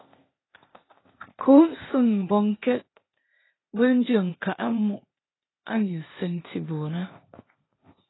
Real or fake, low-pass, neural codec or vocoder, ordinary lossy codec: fake; 7.2 kHz; codec, 16 kHz, 0.8 kbps, ZipCodec; AAC, 16 kbps